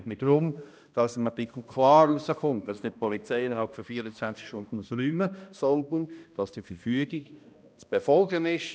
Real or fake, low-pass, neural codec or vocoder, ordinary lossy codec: fake; none; codec, 16 kHz, 1 kbps, X-Codec, HuBERT features, trained on balanced general audio; none